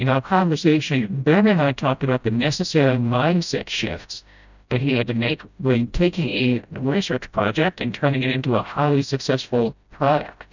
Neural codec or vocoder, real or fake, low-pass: codec, 16 kHz, 0.5 kbps, FreqCodec, smaller model; fake; 7.2 kHz